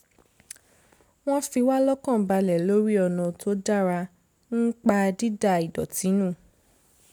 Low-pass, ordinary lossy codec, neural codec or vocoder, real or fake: none; none; none; real